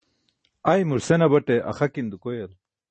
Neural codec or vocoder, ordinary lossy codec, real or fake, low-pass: none; MP3, 32 kbps; real; 10.8 kHz